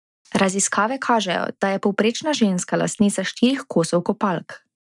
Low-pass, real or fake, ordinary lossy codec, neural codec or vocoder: 10.8 kHz; real; none; none